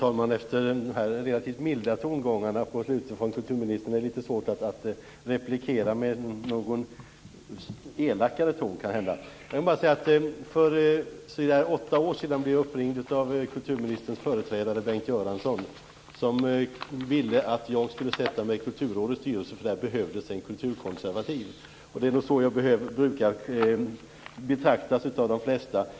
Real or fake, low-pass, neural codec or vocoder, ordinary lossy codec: real; none; none; none